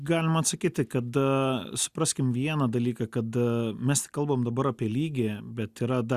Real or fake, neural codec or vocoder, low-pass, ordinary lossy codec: real; none; 14.4 kHz; Opus, 64 kbps